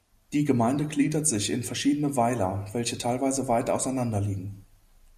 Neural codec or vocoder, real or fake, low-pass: none; real; 14.4 kHz